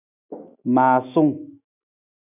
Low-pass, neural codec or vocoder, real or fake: 3.6 kHz; autoencoder, 48 kHz, 128 numbers a frame, DAC-VAE, trained on Japanese speech; fake